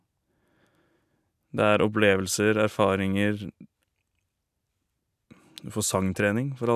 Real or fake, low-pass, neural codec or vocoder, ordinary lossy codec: real; 14.4 kHz; none; none